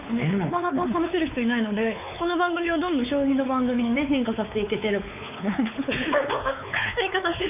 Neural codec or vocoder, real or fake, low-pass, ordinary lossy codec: codec, 16 kHz, 4 kbps, X-Codec, WavLM features, trained on Multilingual LibriSpeech; fake; 3.6 kHz; none